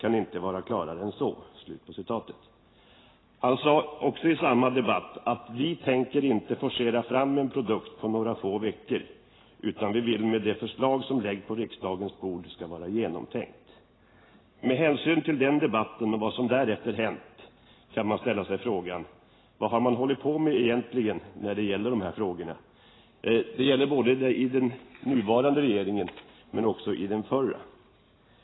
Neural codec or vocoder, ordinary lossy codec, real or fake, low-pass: none; AAC, 16 kbps; real; 7.2 kHz